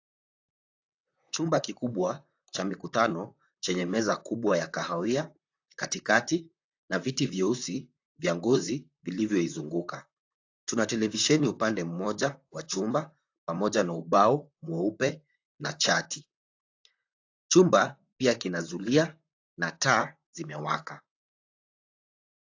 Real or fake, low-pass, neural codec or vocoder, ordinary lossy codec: fake; 7.2 kHz; vocoder, 44.1 kHz, 128 mel bands, Pupu-Vocoder; AAC, 48 kbps